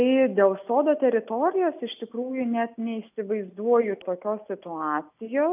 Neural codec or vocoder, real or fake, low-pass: none; real; 3.6 kHz